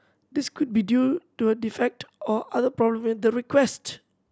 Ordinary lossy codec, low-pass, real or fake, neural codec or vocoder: none; none; real; none